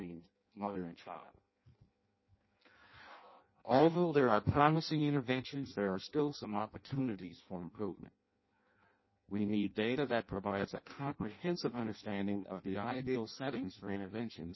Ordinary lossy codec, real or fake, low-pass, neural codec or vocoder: MP3, 24 kbps; fake; 7.2 kHz; codec, 16 kHz in and 24 kHz out, 0.6 kbps, FireRedTTS-2 codec